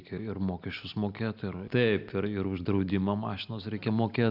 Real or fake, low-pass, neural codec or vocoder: real; 5.4 kHz; none